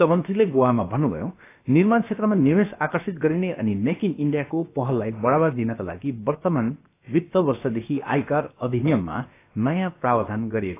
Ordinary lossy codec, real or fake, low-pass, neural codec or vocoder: AAC, 24 kbps; fake; 3.6 kHz; codec, 16 kHz, about 1 kbps, DyCAST, with the encoder's durations